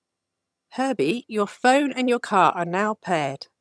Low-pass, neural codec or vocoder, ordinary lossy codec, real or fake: none; vocoder, 22.05 kHz, 80 mel bands, HiFi-GAN; none; fake